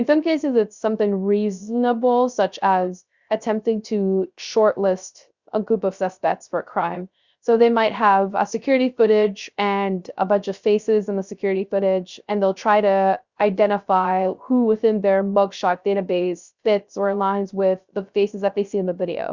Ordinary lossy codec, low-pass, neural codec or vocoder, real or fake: Opus, 64 kbps; 7.2 kHz; codec, 16 kHz, 0.3 kbps, FocalCodec; fake